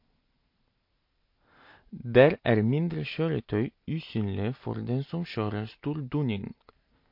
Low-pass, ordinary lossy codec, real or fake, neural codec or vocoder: 5.4 kHz; MP3, 32 kbps; real; none